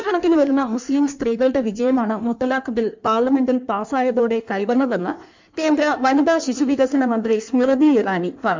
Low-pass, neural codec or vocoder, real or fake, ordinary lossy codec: 7.2 kHz; codec, 16 kHz in and 24 kHz out, 1.1 kbps, FireRedTTS-2 codec; fake; MP3, 64 kbps